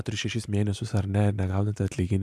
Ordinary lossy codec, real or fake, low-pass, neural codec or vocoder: AAC, 96 kbps; fake; 14.4 kHz; vocoder, 44.1 kHz, 128 mel bands every 512 samples, BigVGAN v2